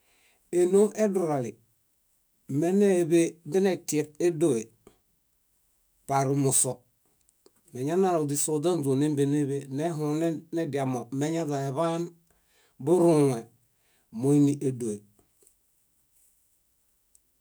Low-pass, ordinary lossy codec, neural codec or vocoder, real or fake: none; none; autoencoder, 48 kHz, 128 numbers a frame, DAC-VAE, trained on Japanese speech; fake